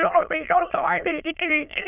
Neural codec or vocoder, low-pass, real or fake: autoencoder, 22.05 kHz, a latent of 192 numbers a frame, VITS, trained on many speakers; 3.6 kHz; fake